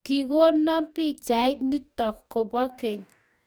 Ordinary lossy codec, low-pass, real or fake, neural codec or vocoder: none; none; fake; codec, 44.1 kHz, 2.6 kbps, DAC